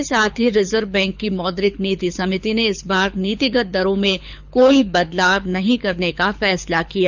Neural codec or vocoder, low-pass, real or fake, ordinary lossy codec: codec, 24 kHz, 6 kbps, HILCodec; 7.2 kHz; fake; none